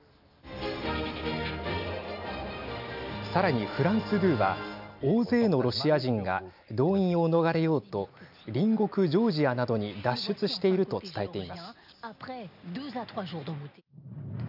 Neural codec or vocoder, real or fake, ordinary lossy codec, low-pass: none; real; none; 5.4 kHz